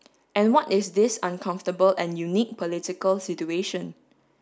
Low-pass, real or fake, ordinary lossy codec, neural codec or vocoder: none; real; none; none